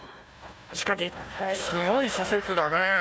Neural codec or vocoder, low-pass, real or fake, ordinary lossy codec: codec, 16 kHz, 1 kbps, FunCodec, trained on Chinese and English, 50 frames a second; none; fake; none